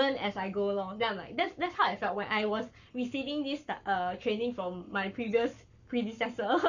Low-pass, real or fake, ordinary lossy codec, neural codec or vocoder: 7.2 kHz; fake; none; codec, 44.1 kHz, 7.8 kbps, Pupu-Codec